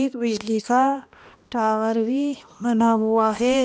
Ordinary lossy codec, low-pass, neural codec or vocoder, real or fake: none; none; codec, 16 kHz, 1 kbps, X-Codec, HuBERT features, trained on balanced general audio; fake